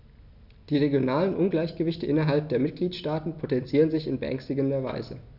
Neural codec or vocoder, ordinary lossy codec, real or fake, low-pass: none; none; real; 5.4 kHz